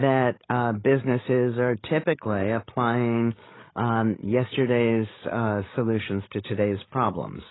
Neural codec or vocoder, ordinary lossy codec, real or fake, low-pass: codec, 16 kHz, 16 kbps, FreqCodec, larger model; AAC, 16 kbps; fake; 7.2 kHz